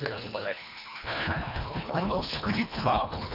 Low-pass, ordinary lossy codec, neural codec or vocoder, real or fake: 5.4 kHz; none; codec, 24 kHz, 1.5 kbps, HILCodec; fake